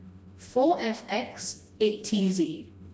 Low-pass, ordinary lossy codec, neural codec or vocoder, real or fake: none; none; codec, 16 kHz, 1 kbps, FreqCodec, smaller model; fake